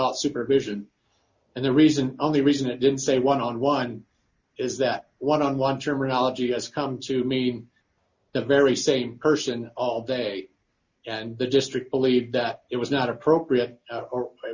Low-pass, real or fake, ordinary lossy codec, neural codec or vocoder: 7.2 kHz; real; Opus, 64 kbps; none